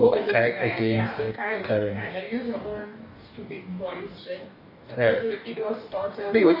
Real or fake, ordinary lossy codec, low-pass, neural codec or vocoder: fake; AAC, 48 kbps; 5.4 kHz; codec, 44.1 kHz, 2.6 kbps, DAC